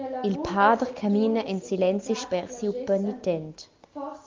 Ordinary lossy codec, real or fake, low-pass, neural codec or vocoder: Opus, 24 kbps; real; 7.2 kHz; none